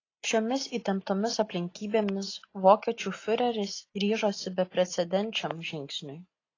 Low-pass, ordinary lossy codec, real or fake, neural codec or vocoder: 7.2 kHz; AAC, 32 kbps; real; none